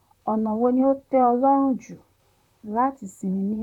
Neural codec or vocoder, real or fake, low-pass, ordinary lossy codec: vocoder, 44.1 kHz, 128 mel bands, Pupu-Vocoder; fake; 19.8 kHz; none